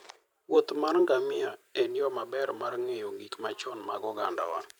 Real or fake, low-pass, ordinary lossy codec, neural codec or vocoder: fake; none; none; vocoder, 44.1 kHz, 128 mel bands every 512 samples, BigVGAN v2